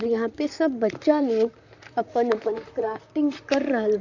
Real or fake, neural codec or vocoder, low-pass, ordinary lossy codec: fake; vocoder, 44.1 kHz, 128 mel bands, Pupu-Vocoder; 7.2 kHz; none